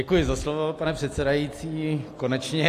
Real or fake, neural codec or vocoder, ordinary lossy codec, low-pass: real; none; AAC, 64 kbps; 14.4 kHz